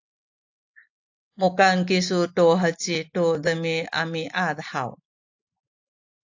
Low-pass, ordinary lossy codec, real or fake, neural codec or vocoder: 7.2 kHz; AAC, 48 kbps; real; none